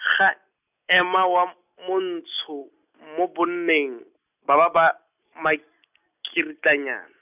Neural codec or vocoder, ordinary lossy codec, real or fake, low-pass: none; none; real; 3.6 kHz